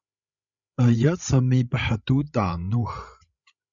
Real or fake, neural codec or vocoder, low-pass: fake; codec, 16 kHz, 16 kbps, FreqCodec, larger model; 7.2 kHz